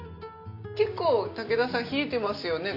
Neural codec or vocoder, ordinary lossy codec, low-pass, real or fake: none; none; 5.4 kHz; real